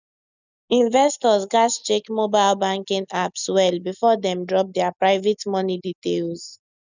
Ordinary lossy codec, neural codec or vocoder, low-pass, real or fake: none; none; 7.2 kHz; real